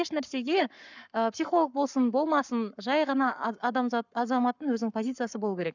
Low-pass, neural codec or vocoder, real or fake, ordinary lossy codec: 7.2 kHz; codec, 44.1 kHz, 7.8 kbps, Pupu-Codec; fake; none